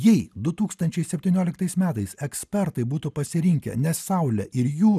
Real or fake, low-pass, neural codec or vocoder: real; 14.4 kHz; none